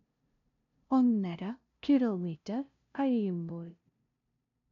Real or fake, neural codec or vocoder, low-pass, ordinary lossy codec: fake; codec, 16 kHz, 0.5 kbps, FunCodec, trained on LibriTTS, 25 frames a second; 7.2 kHz; none